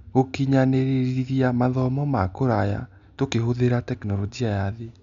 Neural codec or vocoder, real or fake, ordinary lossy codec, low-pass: none; real; none; 7.2 kHz